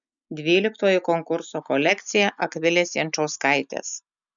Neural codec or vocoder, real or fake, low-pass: none; real; 7.2 kHz